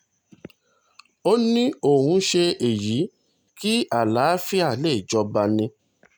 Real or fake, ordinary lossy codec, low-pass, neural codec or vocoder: real; none; none; none